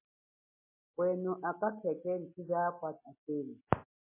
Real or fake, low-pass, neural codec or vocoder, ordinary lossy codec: real; 3.6 kHz; none; MP3, 16 kbps